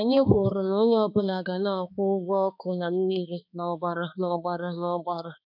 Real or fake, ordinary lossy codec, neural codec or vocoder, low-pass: fake; none; codec, 16 kHz, 2 kbps, X-Codec, HuBERT features, trained on balanced general audio; 5.4 kHz